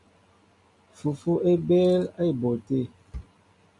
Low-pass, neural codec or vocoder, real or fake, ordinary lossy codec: 10.8 kHz; none; real; MP3, 64 kbps